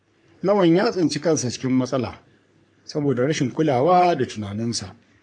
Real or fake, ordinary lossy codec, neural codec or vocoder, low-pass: fake; MP3, 64 kbps; codec, 44.1 kHz, 3.4 kbps, Pupu-Codec; 9.9 kHz